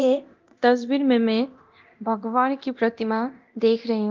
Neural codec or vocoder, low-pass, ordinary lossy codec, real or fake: codec, 24 kHz, 0.9 kbps, DualCodec; 7.2 kHz; Opus, 32 kbps; fake